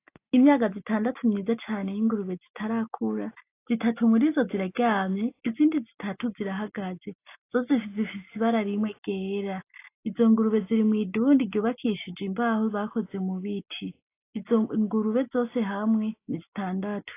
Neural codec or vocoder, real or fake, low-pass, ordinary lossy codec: none; real; 3.6 kHz; AAC, 24 kbps